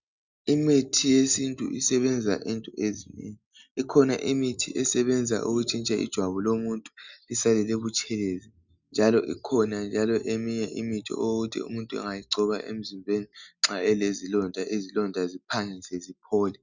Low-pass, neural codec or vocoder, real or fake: 7.2 kHz; none; real